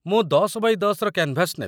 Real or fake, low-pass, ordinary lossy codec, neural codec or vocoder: real; none; none; none